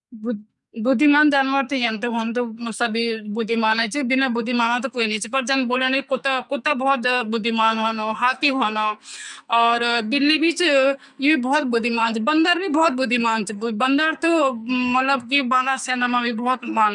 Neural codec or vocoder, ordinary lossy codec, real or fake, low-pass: codec, 44.1 kHz, 2.6 kbps, SNAC; none; fake; 10.8 kHz